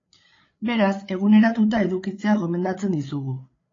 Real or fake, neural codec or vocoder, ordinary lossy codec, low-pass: fake; codec, 16 kHz, 8 kbps, FreqCodec, larger model; AAC, 32 kbps; 7.2 kHz